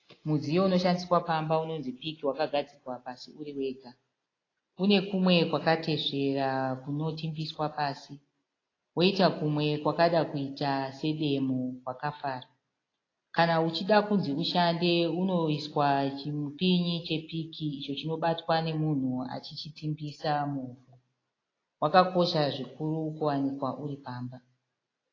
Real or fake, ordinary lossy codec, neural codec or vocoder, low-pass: real; AAC, 32 kbps; none; 7.2 kHz